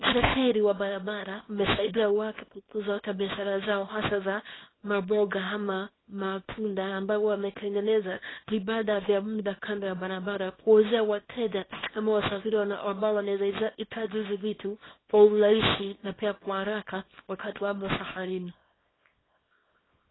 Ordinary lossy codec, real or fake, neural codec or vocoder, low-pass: AAC, 16 kbps; fake; codec, 24 kHz, 0.9 kbps, WavTokenizer, small release; 7.2 kHz